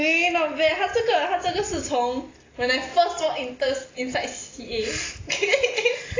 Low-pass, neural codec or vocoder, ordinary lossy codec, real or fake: 7.2 kHz; none; AAC, 32 kbps; real